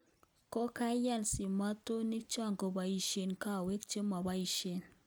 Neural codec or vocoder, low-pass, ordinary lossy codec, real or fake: none; none; none; real